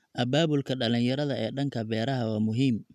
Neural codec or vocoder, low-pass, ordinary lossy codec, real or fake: none; 14.4 kHz; none; real